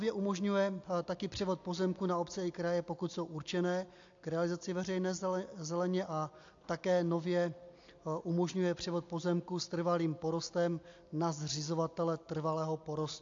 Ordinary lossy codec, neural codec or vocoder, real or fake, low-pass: AAC, 48 kbps; none; real; 7.2 kHz